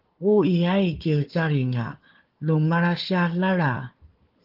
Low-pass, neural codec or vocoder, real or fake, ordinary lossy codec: 5.4 kHz; codec, 16 kHz, 4 kbps, FunCodec, trained on Chinese and English, 50 frames a second; fake; Opus, 16 kbps